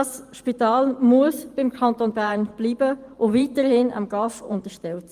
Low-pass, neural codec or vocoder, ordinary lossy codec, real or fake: 14.4 kHz; vocoder, 44.1 kHz, 128 mel bands every 512 samples, BigVGAN v2; Opus, 24 kbps; fake